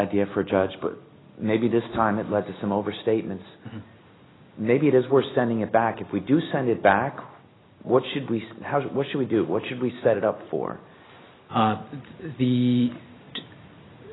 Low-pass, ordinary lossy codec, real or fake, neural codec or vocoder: 7.2 kHz; AAC, 16 kbps; real; none